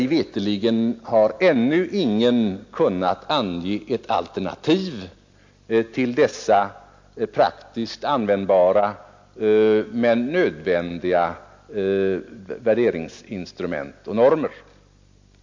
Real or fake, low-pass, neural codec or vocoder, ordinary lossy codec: real; 7.2 kHz; none; MP3, 48 kbps